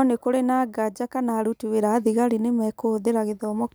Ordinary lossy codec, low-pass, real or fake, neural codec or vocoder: none; none; real; none